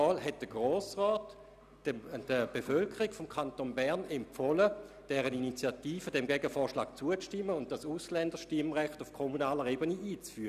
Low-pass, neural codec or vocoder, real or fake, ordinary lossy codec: 14.4 kHz; none; real; none